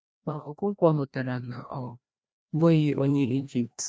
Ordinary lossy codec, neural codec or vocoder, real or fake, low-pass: none; codec, 16 kHz, 1 kbps, FreqCodec, larger model; fake; none